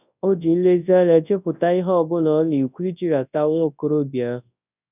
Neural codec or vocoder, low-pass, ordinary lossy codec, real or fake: codec, 24 kHz, 0.9 kbps, WavTokenizer, large speech release; 3.6 kHz; none; fake